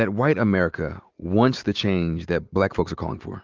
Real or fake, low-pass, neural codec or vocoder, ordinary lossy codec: real; 7.2 kHz; none; Opus, 32 kbps